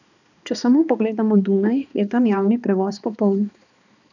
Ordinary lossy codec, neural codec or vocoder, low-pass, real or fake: none; codec, 16 kHz, 2 kbps, X-Codec, HuBERT features, trained on balanced general audio; 7.2 kHz; fake